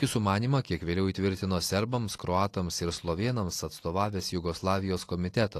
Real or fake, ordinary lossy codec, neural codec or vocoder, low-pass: real; AAC, 48 kbps; none; 14.4 kHz